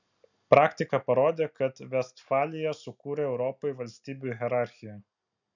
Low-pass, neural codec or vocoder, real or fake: 7.2 kHz; none; real